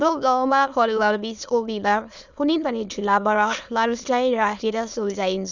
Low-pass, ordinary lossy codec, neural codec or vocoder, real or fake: 7.2 kHz; none; autoencoder, 22.05 kHz, a latent of 192 numbers a frame, VITS, trained on many speakers; fake